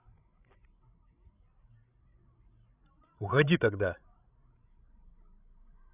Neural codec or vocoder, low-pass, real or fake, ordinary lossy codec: codec, 16 kHz, 16 kbps, FreqCodec, larger model; 3.6 kHz; fake; none